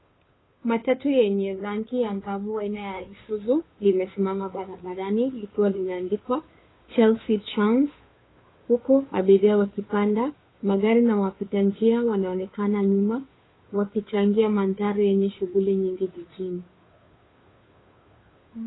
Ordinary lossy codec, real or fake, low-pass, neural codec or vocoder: AAC, 16 kbps; fake; 7.2 kHz; codec, 16 kHz, 2 kbps, FunCodec, trained on Chinese and English, 25 frames a second